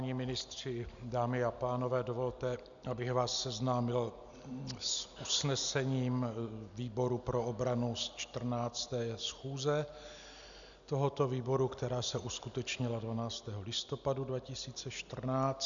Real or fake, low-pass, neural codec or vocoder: real; 7.2 kHz; none